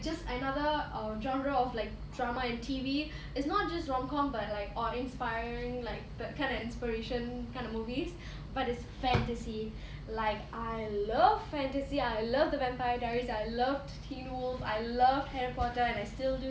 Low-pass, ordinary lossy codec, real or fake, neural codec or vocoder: none; none; real; none